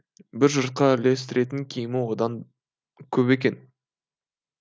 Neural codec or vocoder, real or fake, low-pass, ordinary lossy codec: none; real; none; none